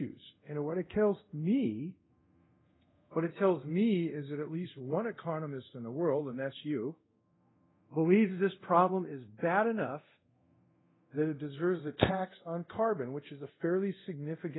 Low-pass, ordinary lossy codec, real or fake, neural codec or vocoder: 7.2 kHz; AAC, 16 kbps; fake; codec, 24 kHz, 0.5 kbps, DualCodec